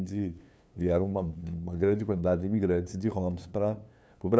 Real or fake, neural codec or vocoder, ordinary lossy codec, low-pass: fake; codec, 16 kHz, 2 kbps, FunCodec, trained on LibriTTS, 25 frames a second; none; none